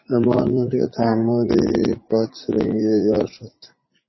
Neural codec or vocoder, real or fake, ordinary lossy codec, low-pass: vocoder, 44.1 kHz, 80 mel bands, Vocos; fake; MP3, 24 kbps; 7.2 kHz